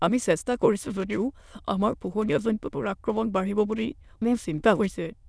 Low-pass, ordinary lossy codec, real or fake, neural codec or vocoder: none; none; fake; autoencoder, 22.05 kHz, a latent of 192 numbers a frame, VITS, trained on many speakers